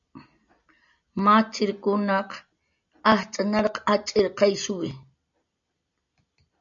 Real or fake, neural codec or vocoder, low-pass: real; none; 7.2 kHz